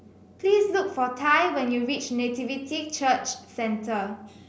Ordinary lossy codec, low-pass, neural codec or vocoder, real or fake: none; none; none; real